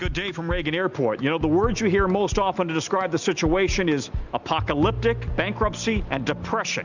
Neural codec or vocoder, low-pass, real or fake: none; 7.2 kHz; real